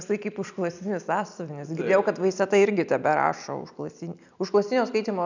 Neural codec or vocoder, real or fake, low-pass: none; real; 7.2 kHz